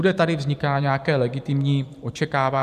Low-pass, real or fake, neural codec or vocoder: 14.4 kHz; fake; vocoder, 44.1 kHz, 128 mel bands every 512 samples, BigVGAN v2